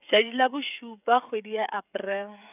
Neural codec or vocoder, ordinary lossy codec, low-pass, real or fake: codec, 16 kHz, 16 kbps, FreqCodec, smaller model; none; 3.6 kHz; fake